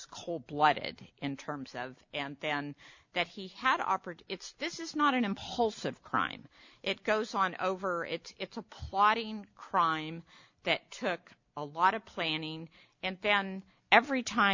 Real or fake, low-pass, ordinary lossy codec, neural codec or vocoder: real; 7.2 kHz; MP3, 48 kbps; none